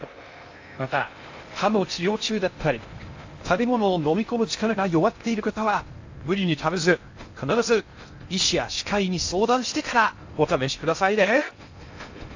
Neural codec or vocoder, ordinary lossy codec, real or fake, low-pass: codec, 16 kHz in and 24 kHz out, 0.6 kbps, FocalCodec, streaming, 2048 codes; AAC, 48 kbps; fake; 7.2 kHz